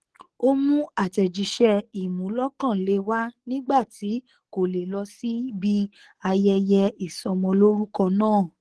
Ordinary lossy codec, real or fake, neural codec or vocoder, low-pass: Opus, 16 kbps; fake; codec, 44.1 kHz, 7.8 kbps, DAC; 10.8 kHz